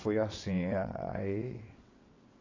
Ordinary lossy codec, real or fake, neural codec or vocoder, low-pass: AAC, 32 kbps; fake; vocoder, 22.05 kHz, 80 mel bands, WaveNeXt; 7.2 kHz